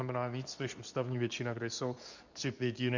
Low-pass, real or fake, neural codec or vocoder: 7.2 kHz; fake; codec, 24 kHz, 0.9 kbps, WavTokenizer, medium speech release version 2